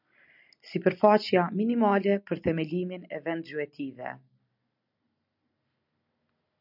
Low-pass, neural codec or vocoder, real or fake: 5.4 kHz; none; real